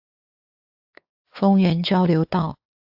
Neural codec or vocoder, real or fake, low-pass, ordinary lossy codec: codec, 16 kHz, 4 kbps, X-Codec, WavLM features, trained on Multilingual LibriSpeech; fake; 5.4 kHz; AAC, 48 kbps